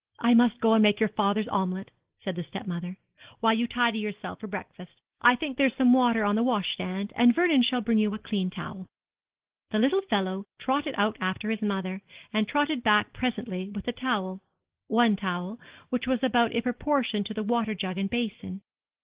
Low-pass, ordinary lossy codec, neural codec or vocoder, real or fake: 3.6 kHz; Opus, 16 kbps; none; real